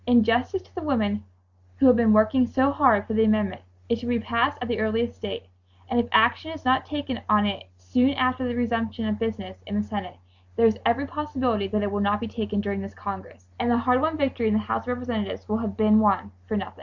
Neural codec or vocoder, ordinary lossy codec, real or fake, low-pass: none; Opus, 64 kbps; real; 7.2 kHz